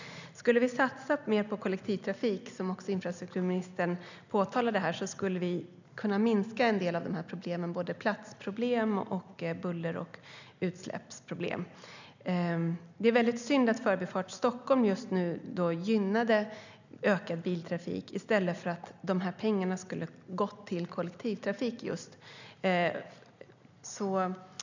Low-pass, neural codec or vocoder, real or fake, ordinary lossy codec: 7.2 kHz; none; real; none